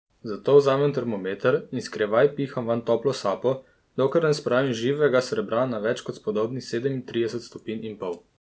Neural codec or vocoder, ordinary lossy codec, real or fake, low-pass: none; none; real; none